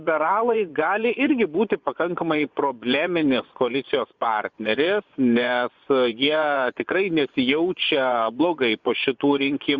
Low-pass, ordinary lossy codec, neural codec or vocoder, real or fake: 7.2 kHz; Opus, 64 kbps; vocoder, 24 kHz, 100 mel bands, Vocos; fake